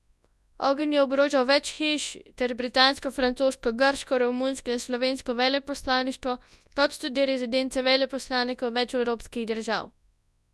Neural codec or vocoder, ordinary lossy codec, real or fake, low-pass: codec, 24 kHz, 0.9 kbps, WavTokenizer, large speech release; none; fake; none